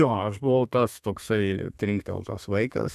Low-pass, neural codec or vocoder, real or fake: 14.4 kHz; codec, 32 kHz, 1.9 kbps, SNAC; fake